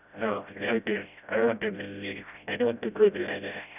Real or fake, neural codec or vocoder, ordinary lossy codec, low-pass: fake; codec, 16 kHz, 0.5 kbps, FreqCodec, smaller model; none; 3.6 kHz